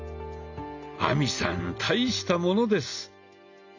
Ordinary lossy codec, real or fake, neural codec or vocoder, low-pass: none; real; none; 7.2 kHz